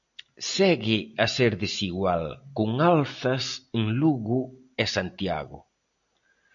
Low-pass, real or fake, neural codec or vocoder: 7.2 kHz; real; none